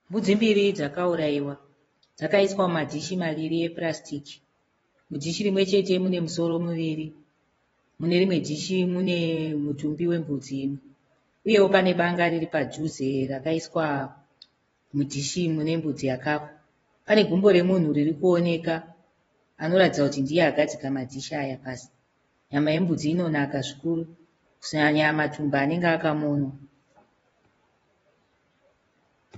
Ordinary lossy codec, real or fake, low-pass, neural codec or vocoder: AAC, 24 kbps; fake; 10.8 kHz; vocoder, 24 kHz, 100 mel bands, Vocos